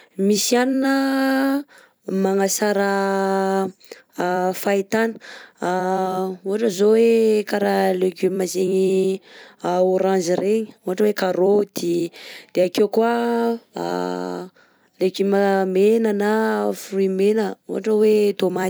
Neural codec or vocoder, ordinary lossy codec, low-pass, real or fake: vocoder, 44.1 kHz, 128 mel bands every 256 samples, BigVGAN v2; none; none; fake